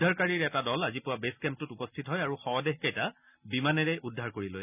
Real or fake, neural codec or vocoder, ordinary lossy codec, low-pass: real; none; none; 3.6 kHz